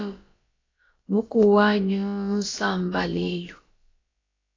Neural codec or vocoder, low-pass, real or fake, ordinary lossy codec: codec, 16 kHz, about 1 kbps, DyCAST, with the encoder's durations; 7.2 kHz; fake; AAC, 32 kbps